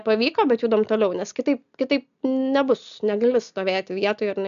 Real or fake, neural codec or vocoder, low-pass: real; none; 7.2 kHz